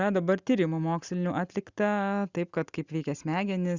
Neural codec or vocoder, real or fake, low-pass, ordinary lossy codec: none; real; 7.2 kHz; Opus, 64 kbps